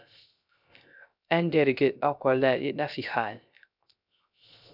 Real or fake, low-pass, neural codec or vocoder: fake; 5.4 kHz; codec, 16 kHz, 0.3 kbps, FocalCodec